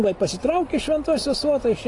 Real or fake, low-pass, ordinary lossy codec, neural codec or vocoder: real; 10.8 kHz; AAC, 32 kbps; none